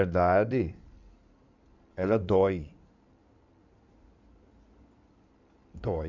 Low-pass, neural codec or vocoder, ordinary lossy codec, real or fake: 7.2 kHz; codec, 16 kHz in and 24 kHz out, 2.2 kbps, FireRedTTS-2 codec; none; fake